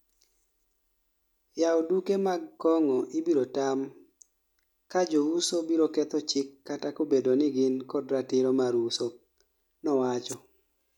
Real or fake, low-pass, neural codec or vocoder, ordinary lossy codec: real; 19.8 kHz; none; none